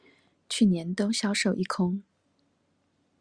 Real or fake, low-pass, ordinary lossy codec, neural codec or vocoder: real; 9.9 kHz; Opus, 64 kbps; none